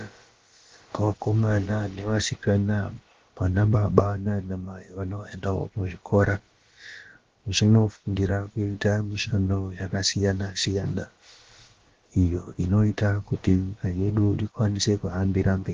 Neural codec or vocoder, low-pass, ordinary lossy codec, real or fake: codec, 16 kHz, about 1 kbps, DyCAST, with the encoder's durations; 7.2 kHz; Opus, 16 kbps; fake